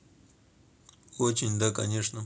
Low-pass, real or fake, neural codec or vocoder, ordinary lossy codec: none; real; none; none